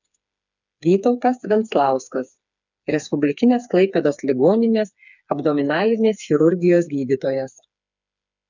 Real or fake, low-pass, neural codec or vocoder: fake; 7.2 kHz; codec, 16 kHz, 4 kbps, FreqCodec, smaller model